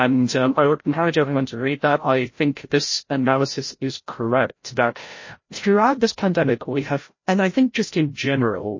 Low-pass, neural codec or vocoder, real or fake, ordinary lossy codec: 7.2 kHz; codec, 16 kHz, 0.5 kbps, FreqCodec, larger model; fake; MP3, 32 kbps